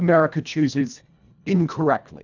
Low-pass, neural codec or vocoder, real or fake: 7.2 kHz; codec, 24 kHz, 1.5 kbps, HILCodec; fake